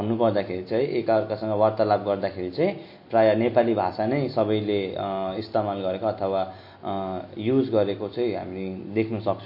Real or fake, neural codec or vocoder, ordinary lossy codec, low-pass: real; none; MP3, 32 kbps; 5.4 kHz